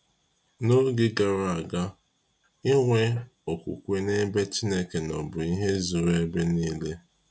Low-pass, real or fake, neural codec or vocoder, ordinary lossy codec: none; real; none; none